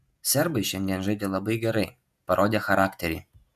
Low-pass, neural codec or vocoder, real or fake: 14.4 kHz; vocoder, 48 kHz, 128 mel bands, Vocos; fake